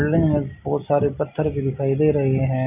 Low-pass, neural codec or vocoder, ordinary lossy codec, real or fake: 3.6 kHz; none; none; real